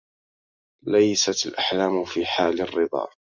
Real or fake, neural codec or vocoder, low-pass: real; none; 7.2 kHz